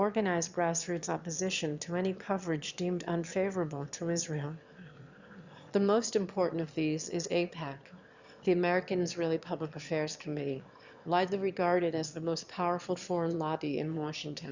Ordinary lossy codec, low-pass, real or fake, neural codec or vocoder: Opus, 64 kbps; 7.2 kHz; fake; autoencoder, 22.05 kHz, a latent of 192 numbers a frame, VITS, trained on one speaker